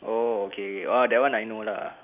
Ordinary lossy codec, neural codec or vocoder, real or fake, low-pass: none; none; real; 3.6 kHz